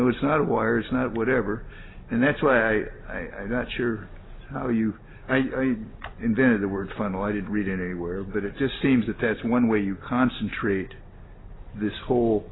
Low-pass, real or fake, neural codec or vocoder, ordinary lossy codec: 7.2 kHz; real; none; AAC, 16 kbps